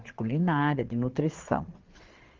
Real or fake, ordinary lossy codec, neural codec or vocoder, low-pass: fake; Opus, 16 kbps; vocoder, 22.05 kHz, 80 mel bands, Vocos; 7.2 kHz